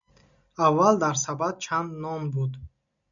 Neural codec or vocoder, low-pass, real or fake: none; 7.2 kHz; real